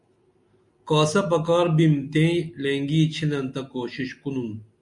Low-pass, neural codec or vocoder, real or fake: 10.8 kHz; none; real